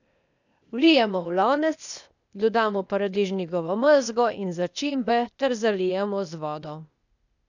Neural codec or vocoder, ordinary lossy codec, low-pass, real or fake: codec, 16 kHz, 0.8 kbps, ZipCodec; none; 7.2 kHz; fake